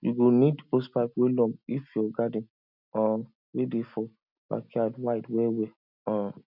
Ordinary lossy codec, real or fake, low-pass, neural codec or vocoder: none; real; 5.4 kHz; none